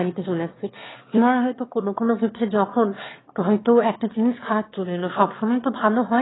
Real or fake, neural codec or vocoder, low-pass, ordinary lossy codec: fake; autoencoder, 22.05 kHz, a latent of 192 numbers a frame, VITS, trained on one speaker; 7.2 kHz; AAC, 16 kbps